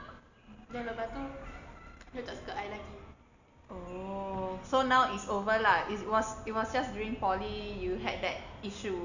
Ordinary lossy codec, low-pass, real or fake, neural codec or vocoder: none; 7.2 kHz; real; none